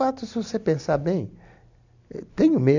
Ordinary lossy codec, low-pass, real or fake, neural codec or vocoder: none; 7.2 kHz; real; none